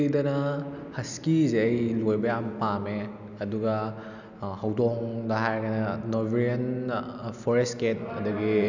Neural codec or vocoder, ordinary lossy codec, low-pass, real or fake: none; none; 7.2 kHz; real